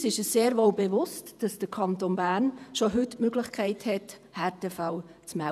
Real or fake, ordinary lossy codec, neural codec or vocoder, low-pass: fake; none; vocoder, 48 kHz, 128 mel bands, Vocos; 14.4 kHz